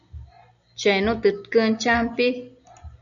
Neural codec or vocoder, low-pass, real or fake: none; 7.2 kHz; real